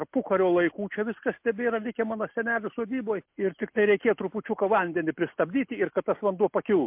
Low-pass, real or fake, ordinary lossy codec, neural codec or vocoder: 3.6 kHz; real; MP3, 32 kbps; none